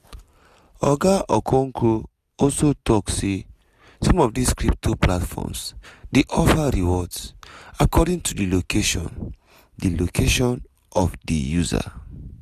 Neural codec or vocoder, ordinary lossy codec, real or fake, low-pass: none; none; real; 14.4 kHz